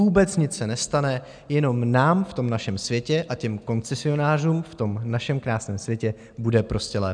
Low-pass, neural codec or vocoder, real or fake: 9.9 kHz; none; real